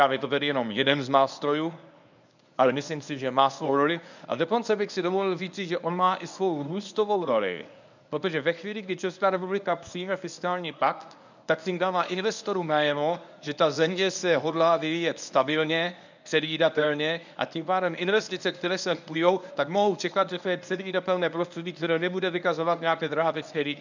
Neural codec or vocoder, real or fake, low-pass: codec, 24 kHz, 0.9 kbps, WavTokenizer, medium speech release version 1; fake; 7.2 kHz